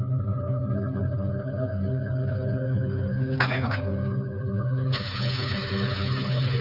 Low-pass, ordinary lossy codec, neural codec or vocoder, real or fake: 5.4 kHz; none; codec, 16 kHz, 4 kbps, FreqCodec, smaller model; fake